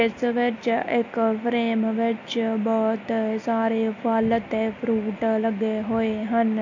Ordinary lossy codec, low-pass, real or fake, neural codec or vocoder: AAC, 48 kbps; 7.2 kHz; real; none